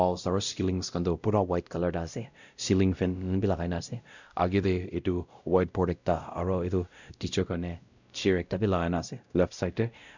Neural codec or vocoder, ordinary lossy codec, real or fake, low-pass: codec, 16 kHz, 0.5 kbps, X-Codec, WavLM features, trained on Multilingual LibriSpeech; none; fake; 7.2 kHz